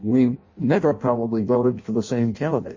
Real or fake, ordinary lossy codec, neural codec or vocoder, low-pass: fake; MP3, 32 kbps; codec, 16 kHz in and 24 kHz out, 0.6 kbps, FireRedTTS-2 codec; 7.2 kHz